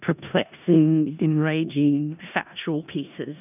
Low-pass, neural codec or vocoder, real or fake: 3.6 kHz; codec, 16 kHz in and 24 kHz out, 0.4 kbps, LongCat-Audio-Codec, four codebook decoder; fake